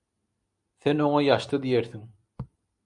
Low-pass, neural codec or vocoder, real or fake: 10.8 kHz; none; real